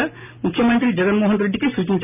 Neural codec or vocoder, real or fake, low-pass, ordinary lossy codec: none; real; 3.6 kHz; none